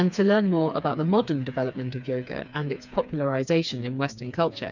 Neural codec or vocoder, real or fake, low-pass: codec, 16 kHz, 4 kbps, FreqCodec, smaller model; fake; 7.2 kHz